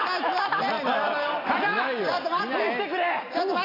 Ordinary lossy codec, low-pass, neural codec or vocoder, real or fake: AAC, 24 kbps; 5.4 kHz; none; real